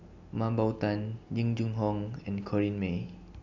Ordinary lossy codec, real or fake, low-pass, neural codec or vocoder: none; real; 7.2 kHz; none